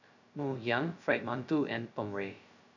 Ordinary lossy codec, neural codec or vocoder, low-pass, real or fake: none; codec, 16 kHz, 0.2 kbps, FocalCodec; 7.2 kHz; fake